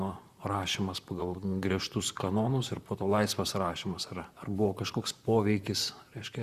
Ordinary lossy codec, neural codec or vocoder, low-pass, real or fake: Opus, 64 kbps; vocoder, 44.1 kHz, 128 mel bands, Pupu-Vocoder; 14.4 kHz; fake